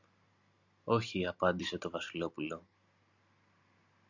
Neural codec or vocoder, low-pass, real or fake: none; 7.2 kHz; real